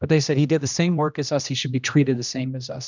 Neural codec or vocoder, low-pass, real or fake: codec, 16 kHz, 1 kbps, X-Codec, HuBERT features, trained on general audio; 7.2 kHz; fake